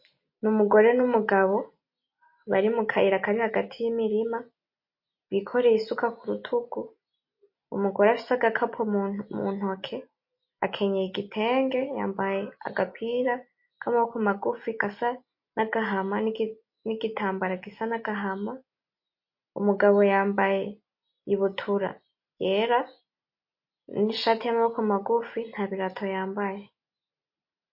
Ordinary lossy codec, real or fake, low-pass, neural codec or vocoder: MP3, 32 kbps; real; 5.4 kHz; none